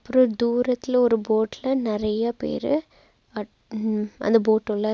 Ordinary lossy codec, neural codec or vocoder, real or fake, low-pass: none; none; real; none